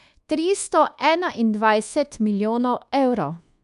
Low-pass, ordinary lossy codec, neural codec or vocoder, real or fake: 10.8 kHz; AAC, 96 kbps; codec, 24 kHz, 1.2 kbps, DualCodec; fake